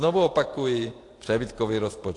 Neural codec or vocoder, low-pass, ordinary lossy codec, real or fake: vocoder, 44.1 kHz, 128 mel bands every 512 samples, BigVGAN v2; 10.8 kHz; AAC, 48 kbps; fake